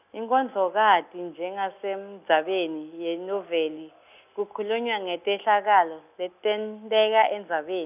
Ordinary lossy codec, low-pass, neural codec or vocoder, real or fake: none; 3.6 kHz; none; real